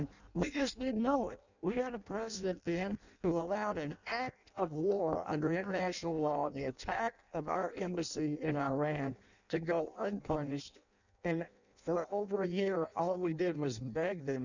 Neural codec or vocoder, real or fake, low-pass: codec, 16 kHz in and 24 kHz out, 0.6 kbps, FireRedTTS-2 codec; fake; 7.2 kHz